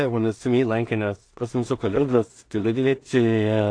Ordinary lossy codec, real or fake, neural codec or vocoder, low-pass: AAC, 48 kbps; fake; codec, 16 kHz in and 24 kHz out, 0.4 kbps, LongCat-Audio-Codec, two codebook decoder; 9.9 kHz